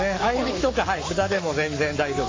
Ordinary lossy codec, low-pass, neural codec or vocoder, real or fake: AAC, 32 kbps; 7.2 kHz; codec, 44.1 kHz, 7.8 kbps, Pupu-Codec; fake